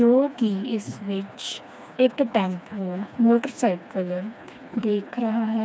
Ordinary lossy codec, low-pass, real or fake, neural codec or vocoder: none; none; fake; codec, 16 kHz, 2 kbps, FreqCodec, smaller model